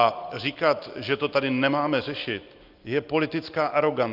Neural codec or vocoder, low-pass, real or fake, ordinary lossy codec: none; 5.4 kHz; real; Opus, 24 kbps